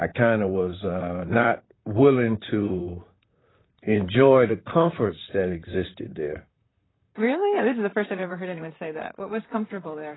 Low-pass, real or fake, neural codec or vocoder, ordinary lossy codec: 7.2 kHz; fake; vocoder, 44.1 kHz, 128 mel bands, Pupu-Vocoder; AAC, 16 kbps